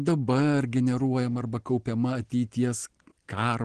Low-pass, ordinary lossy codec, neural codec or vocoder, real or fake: 9.9 kHz; Opus, 16 kbps; none; real